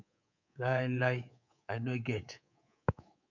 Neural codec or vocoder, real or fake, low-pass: codec, 24 kHz, 3.1 kbps, DualCodec; fake; 7.2 kHz